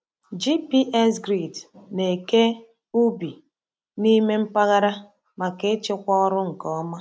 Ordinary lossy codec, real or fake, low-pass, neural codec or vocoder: none; real; none; none